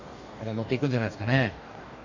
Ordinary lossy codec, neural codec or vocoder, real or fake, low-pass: none; codec, 44.1 kHz, 2.6 kbps, DAC; fake; 7.2 kHz